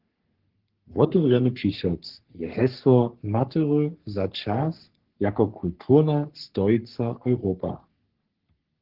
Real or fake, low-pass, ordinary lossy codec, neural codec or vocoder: fake; 5.4 kHz; Opus, 16 kbps; codec, 44.1 kHz, 3.4 kbps, Pupu-Codec